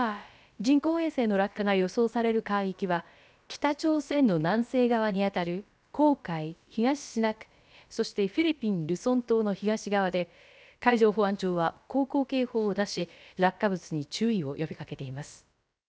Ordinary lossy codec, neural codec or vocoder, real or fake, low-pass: none; codec, 16 kHz, about 1 kbps, DyCAST, with the encoder's durations; fake; none